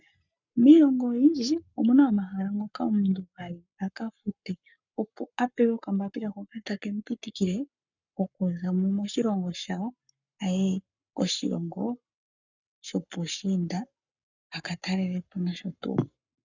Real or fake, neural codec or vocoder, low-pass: fake; codec, 44.1 kHz, 7.8 kbps, Pupu-Codec; 7.2 kHz